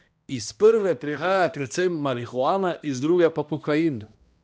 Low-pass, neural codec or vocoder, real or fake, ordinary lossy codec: none; codec, 16 kHz, 1 kbps, X-Codec, HuBERT features, trained on balanced general audio; fake; none